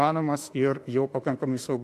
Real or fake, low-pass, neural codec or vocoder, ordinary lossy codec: fake; 14.4 kHz; autoencoder, 48 kHz, 32 numbers a frame, DAC-VAE, trained on Japanese speech; AAC, 64 kbps